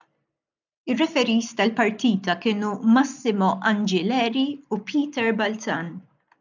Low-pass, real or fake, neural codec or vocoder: 7.2 kHz; real; none